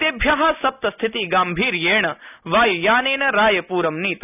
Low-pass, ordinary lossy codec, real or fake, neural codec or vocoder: 3.6 kHz; none; real; none